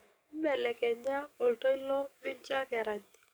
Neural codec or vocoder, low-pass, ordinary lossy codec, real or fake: codec, 44.1 kHz, 7.8 kbps, DAC; none; none; fake